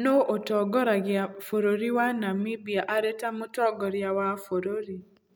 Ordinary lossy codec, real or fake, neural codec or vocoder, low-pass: none; real; none; none